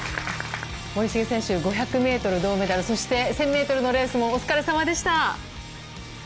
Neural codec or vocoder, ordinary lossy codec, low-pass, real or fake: none; none; none; real